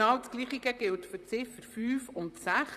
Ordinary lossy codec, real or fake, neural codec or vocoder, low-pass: none; fake; vocoder, 44.1 kHz, 128 mel bands, Pupu-Vocoder; 14.4 kHz